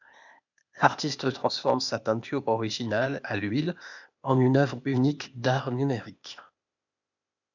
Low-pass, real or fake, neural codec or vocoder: 7.2 kHz; fake; codec, 16 kHz, 0.8 kbps, ZipCodec